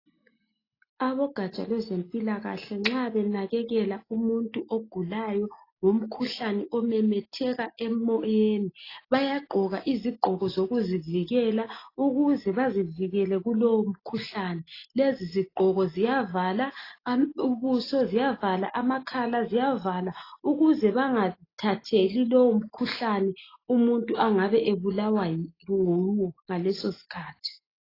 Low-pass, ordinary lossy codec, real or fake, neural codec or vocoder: 5.4 kHz; AAC, 24 kbps; real; none